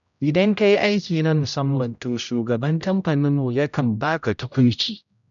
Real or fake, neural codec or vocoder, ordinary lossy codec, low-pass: fake; codec, 16 kHz, 0.5 kbps, X-Codec, HuBERT features, trained on general audio; none; 7.2 kHz